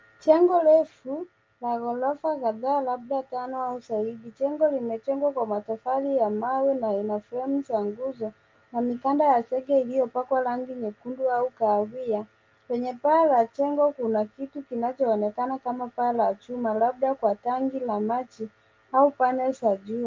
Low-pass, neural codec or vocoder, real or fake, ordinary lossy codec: 7.2 kHz; none; real; Opus, 24 kbps